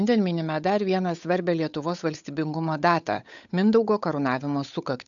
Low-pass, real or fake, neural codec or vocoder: 7.2 kHz; fake; codec, 16 kHz, 8 kbps, FunCodec, trained on Chinese and English, 25 frames a second